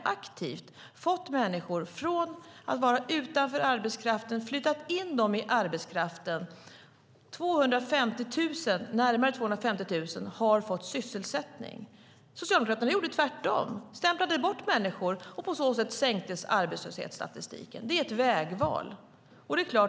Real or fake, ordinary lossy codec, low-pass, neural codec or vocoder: real; none; none; none